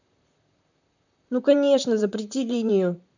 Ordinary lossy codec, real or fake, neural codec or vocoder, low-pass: none; fake; vocoder, 44.1 kHz, 128 mel bands, Pupu-Vocoder; 7.2 kHz